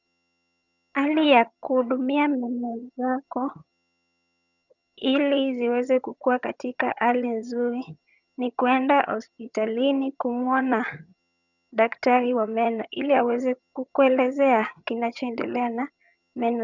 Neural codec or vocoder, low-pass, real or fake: vocoder, 22.05 kHz, 80 mel bands, HiFi-GAN; 7.2 kHz; fake